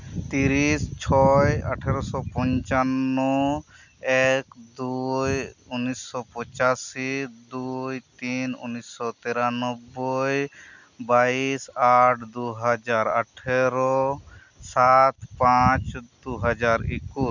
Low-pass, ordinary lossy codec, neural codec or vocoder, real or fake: 7.2 kHz; none; none; real